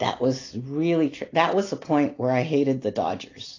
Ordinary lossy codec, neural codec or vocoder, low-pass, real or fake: AAC, 32 kbps; none; 7.2 kHz; real